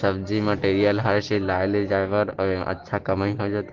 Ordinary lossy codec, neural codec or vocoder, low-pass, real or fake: Opus, 16 kbps; none; 7.2 kHz; real